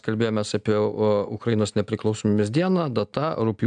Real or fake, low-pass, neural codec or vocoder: real; 9.9 kHz; none